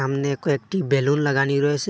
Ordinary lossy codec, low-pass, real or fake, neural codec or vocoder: none; none; real; none